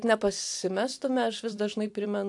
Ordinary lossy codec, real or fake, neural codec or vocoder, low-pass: AAC, 64 kbps; fake; vocoder, 44.1 kHz, 128 mel bands every 256 samples, BigVGAN v2; 10.8 kHz